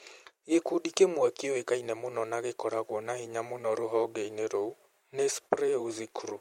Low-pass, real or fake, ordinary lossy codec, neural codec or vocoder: 19.8 kHz; fake; MP3, 64 kbps; vocoder, 44.1 kHz, 128 mel bands every 512 samples, BigVGAN v2